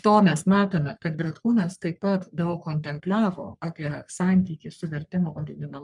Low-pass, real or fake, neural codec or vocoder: 10.8 kHz; fake; codec, 44.1 kHz, 3.4 kbps, Pupu-Codec